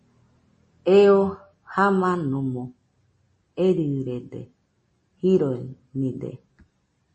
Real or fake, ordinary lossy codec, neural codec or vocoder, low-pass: real; MP3, 32 kbps; none; 10.8 kHz